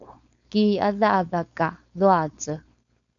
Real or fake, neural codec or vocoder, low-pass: fake; codec, 16 kHz, 4.8 kbps, FACodec; 7.2 kHz